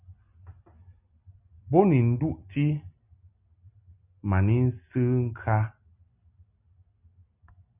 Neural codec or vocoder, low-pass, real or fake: none; 3.6 kHz; real